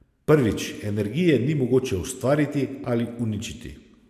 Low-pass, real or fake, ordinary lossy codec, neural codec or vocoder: 14.4 kHz; real; AAC, 96 kbps; none